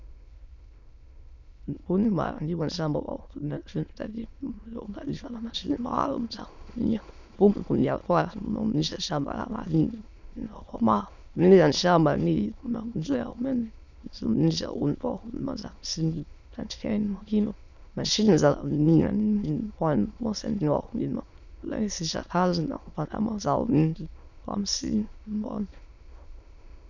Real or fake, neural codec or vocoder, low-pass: fake; autoencoder, 22.05 kHz, a latent of 192 numbers a frame, VITS, trained on many speakers; 7.2 kHz